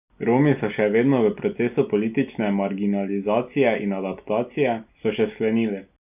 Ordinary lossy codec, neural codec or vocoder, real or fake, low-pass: none; none; real; 3.6 kHz